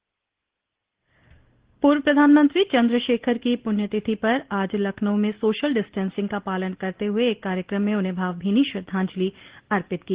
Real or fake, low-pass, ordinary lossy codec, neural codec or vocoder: real; 3.6 kHz; Opus, 16 kbps; none